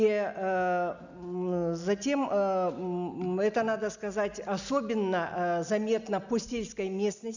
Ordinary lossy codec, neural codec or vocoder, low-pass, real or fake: none; none; 7.2 kHz; real